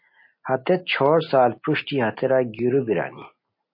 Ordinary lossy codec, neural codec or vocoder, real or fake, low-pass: MP3, 32 kbps; none; real; 5.4 kHz